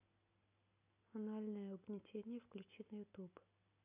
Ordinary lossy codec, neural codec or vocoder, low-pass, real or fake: AAC, 24 kbps; none; 3.6 kHz; real